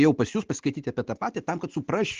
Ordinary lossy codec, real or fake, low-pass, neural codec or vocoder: Opus, 16 kbps; real; 7.2 kHz; none